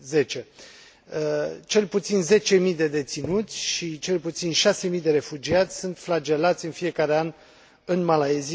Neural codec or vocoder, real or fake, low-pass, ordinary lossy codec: none; real; none; none